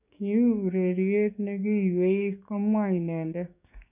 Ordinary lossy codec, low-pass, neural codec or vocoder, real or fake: none; 3.6 kHz; codec, 16 kHz, 6 kbps, DAC; fake